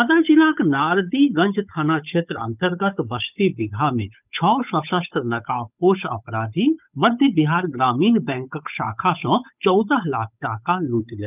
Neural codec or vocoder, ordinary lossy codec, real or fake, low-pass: codec, 16 kHz, 8 kbps, FunCodec, trained on Chinese and English, 25 frames a second; none; fake; 3.6 kHz